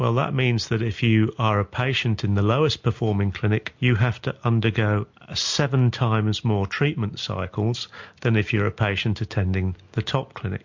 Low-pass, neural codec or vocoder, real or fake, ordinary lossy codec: 7.2 kHz; none; real; MP3, 48 kbps